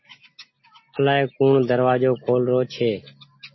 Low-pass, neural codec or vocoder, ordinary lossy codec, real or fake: 7.2 kHz; none; MP3, 24 kbps; real